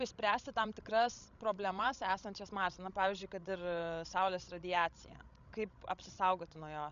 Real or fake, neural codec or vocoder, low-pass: fake; codec, 16 kHz, 16 kbps, FreqCodec, larger model; 7.2 kHz